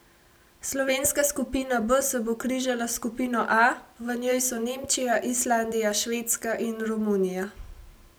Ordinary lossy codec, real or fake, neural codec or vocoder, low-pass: none; real; none; none